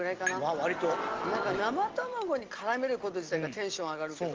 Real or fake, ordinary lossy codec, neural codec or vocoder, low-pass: real; Opus, 16 kbps; none; 7.2 kHz